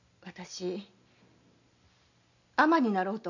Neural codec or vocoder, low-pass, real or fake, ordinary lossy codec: none; 7.2 kHz; real; none